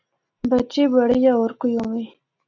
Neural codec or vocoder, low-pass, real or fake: none; 7.2 kHz; real